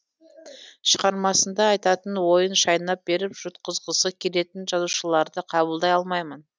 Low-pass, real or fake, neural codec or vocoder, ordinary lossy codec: none; real; none; none